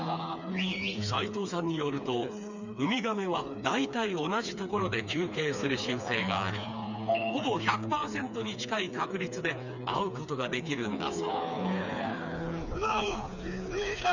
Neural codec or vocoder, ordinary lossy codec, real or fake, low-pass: codec, 16 kHz, 4 kbps, FreqCodec, smaller model; none; fake; 7.2 kHz